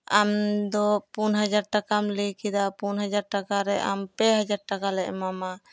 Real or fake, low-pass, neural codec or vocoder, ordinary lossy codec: real; none; none; none